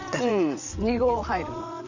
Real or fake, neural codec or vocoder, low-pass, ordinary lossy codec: fake; vocoder, 22.05 kHz, 80 mel bands, WaveNeXt; 7.2 kHz; none